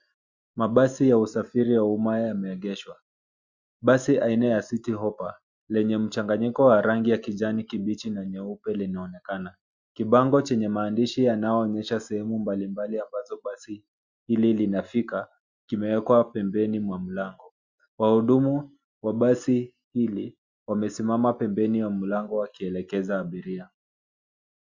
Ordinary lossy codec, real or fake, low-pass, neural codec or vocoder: Opus, 64 kbps; real; 7.2 kHz; none